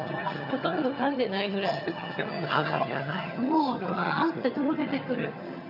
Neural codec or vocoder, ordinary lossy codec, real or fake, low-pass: vocoder, 22.05 kHz, 80 mel bands, HiFi-GAN; none; fake; 5.4 kHz